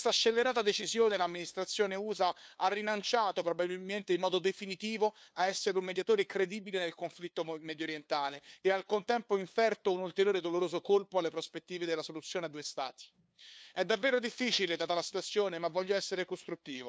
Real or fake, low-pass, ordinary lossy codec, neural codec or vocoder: fake; none; none; codec, 16 kHz, 2 kbps, FunCodec, trained on LibriTTS, 25 frames a second